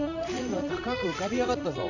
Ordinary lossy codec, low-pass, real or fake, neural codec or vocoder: none; 7.2 kHz; fake; vocoder, 44.1 kHz, 80 mel bands, Vocos